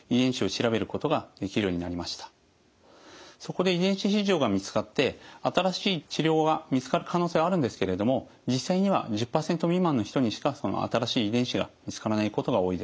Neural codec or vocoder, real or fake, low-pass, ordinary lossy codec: none; real; none; none